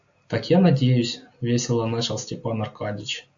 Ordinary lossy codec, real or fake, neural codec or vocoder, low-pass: AAC, 64 kbps; real; none; 7.2 kHz